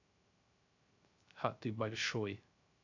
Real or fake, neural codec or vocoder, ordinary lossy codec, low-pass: fake; codec, 16 kHz, 0.3 kbps, FocalCodec; none; 7.2 kHz